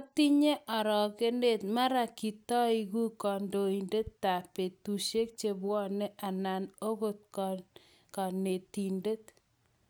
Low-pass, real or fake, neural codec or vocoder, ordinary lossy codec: none; real; none; none